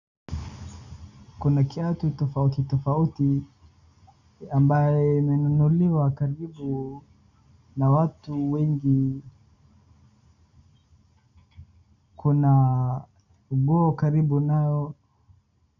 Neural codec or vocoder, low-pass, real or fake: none; 7.2 kHz; real